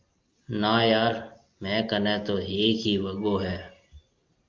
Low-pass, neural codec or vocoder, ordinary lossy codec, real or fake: 7.2 kHz; none; Opus, 24 kbps; real